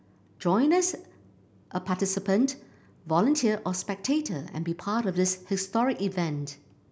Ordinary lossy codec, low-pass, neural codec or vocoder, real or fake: none; none; none; real